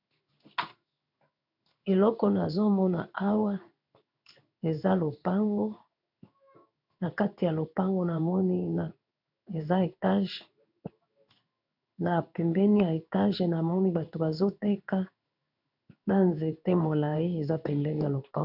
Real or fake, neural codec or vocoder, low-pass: fake; codec, 16 kHz in and 24 kHz out, 1 kbps, XY-Tokenizer; 5.4 kHz